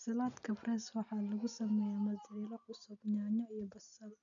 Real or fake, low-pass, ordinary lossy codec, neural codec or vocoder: real; 7.2 kHz; none; none